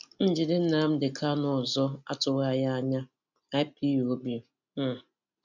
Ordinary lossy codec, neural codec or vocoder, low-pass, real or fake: none; none; 7.2 kHz; real